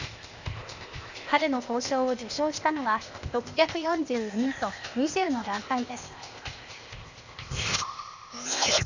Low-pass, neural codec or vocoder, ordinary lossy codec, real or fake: 7.2 kHz; codec, 16 kHz, 0.8 kbps, ZipCodec; none; fake